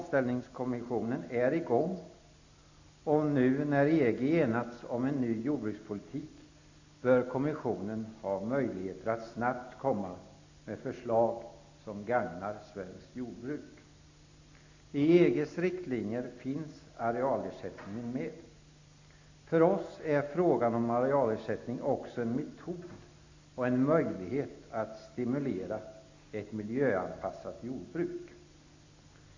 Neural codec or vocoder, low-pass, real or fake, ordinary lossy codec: none; 7.2 kHz; real; AAC, 48 kbps